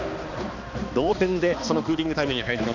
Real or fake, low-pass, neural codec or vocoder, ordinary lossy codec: fake; 7.2 kHz; codec, 16 kHz, 2 kbps, X-Codec, HuBERT features, trained on balanced general audio; none